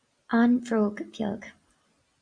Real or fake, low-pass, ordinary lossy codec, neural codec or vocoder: fake; 9.9 kHz; Opus, 64 kbps; vocoder, 44.1 kHz, 128 mel bands every 256 samples, BigVGAN v2